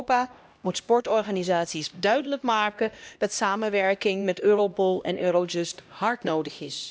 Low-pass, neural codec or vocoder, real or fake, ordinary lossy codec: none; codec, 16 kHz, 1 kbps, X-Codec, HuBERT features, trained on LibriSpeech; fake; none